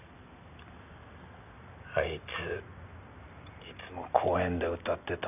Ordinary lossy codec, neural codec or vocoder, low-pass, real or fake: none; none; 3.6 kHz; real